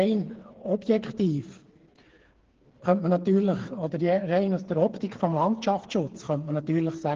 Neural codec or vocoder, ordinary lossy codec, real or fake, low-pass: codec, 16 kHz, 4 kbps, FreqCodec, smaller model; Opus, 16 kbps; fake; 7.2 kHz